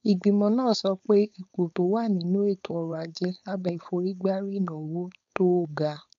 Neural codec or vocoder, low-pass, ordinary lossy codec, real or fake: codec, 16 kHz, 4.8 kbps, FACodec; 7.2 kHz; none; fake